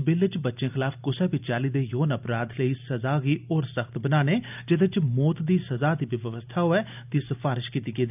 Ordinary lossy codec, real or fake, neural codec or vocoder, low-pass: none; real; none; 3.6 kHz